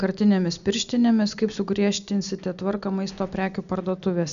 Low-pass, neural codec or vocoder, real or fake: 7.2 kHz; none; real